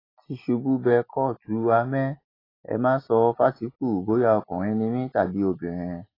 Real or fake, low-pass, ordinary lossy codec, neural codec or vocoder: real; 5.4 kHz; AAC, 24 kbps; none